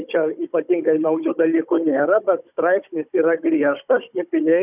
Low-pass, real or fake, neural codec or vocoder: 3.6 kHz; fake; codec, 16 kHz, 16 kbps, FunCodec, trained on Chinese and English, 50 frames a second